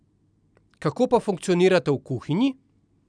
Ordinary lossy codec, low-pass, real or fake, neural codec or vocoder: none; 9.9 kHz; real; none